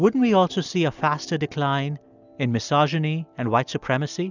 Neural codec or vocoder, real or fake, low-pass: none; real; 7.2 kHz